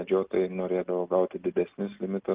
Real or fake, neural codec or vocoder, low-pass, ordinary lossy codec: real; none; 3.6 kHz; Opus, 32 kbps